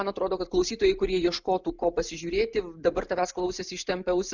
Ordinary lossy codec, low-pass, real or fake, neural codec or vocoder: Opus, 64 kbps; 7.2 kHz; real; none